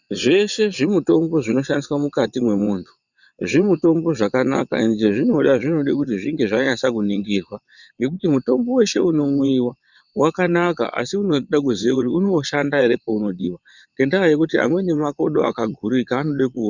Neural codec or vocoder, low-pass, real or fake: vocoder, 22.05 kHz, 80 mel bands, WaveNeXt; 7.2 kHz; fake